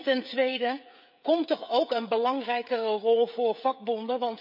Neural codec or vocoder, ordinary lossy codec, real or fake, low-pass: codec, 16 kHz, 16 kbps, FreqCodec, smaller model; none; fake; 5.4 kHz